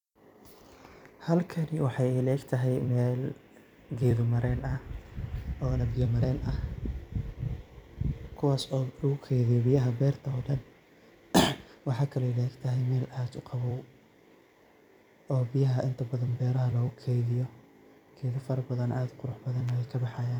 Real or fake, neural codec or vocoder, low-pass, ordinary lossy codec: fake; vocoder, 44.1 kHz, 128 mel bands every 256 samples, BigVGAN v2; 19.8 kHz; none